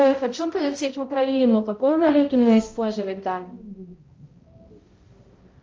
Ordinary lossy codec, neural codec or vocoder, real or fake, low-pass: Opus, 24 kbps; codec, 16 kHz, 0.5 kbps, X-Codec, HuBERT features, trained on balanced general audio; fake; 7.2 kHz